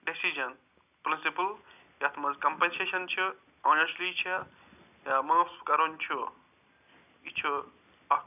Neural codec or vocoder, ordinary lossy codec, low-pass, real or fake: none; none; 3.6 kHz; real